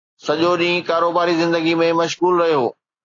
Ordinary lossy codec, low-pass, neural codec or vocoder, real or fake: AAC, 32 kbps; 7.2 kHz; none; real